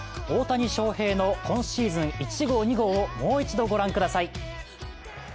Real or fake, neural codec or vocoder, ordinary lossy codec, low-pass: real; none; none; none